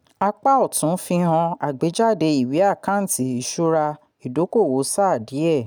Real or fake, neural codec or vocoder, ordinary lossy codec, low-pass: real; none; none; none